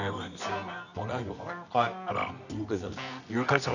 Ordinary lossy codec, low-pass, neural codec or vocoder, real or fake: none; 7.2 kHz; codec, 24 kHz, 0.9 kbps, WavTokenizer, medium music audio release; fake